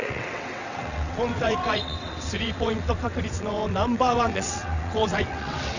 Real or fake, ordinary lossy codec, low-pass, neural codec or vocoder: fake; none; 7.2 kHz; vocoder, 22.05 kHz, 80 mel bands, WaveNeXt